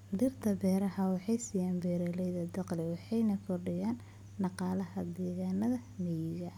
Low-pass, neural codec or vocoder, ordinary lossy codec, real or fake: 19.8 kHz; none; none; real